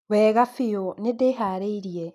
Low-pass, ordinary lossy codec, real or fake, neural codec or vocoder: 14.4 kHz; none; real; none